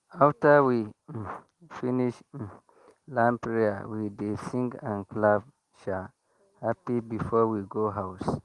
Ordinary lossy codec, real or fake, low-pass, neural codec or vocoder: Opus, 24 kbps; real; 10.8 kHz; none